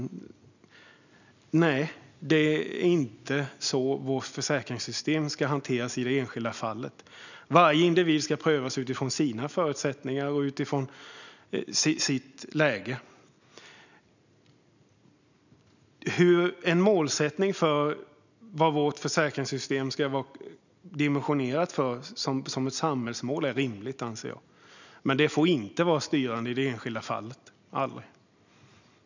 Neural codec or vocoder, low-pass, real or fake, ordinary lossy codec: none; 7.2 kHz; real; none